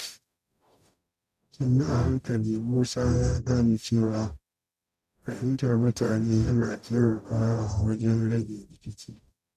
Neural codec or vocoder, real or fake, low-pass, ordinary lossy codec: codec, 44.1 kHz, 0.9 kbps, DAC; fake; 14.4 kHz; none